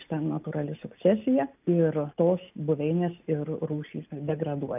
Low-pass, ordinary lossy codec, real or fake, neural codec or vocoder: 3.6 kHz; AAC, 32 kbps; real; none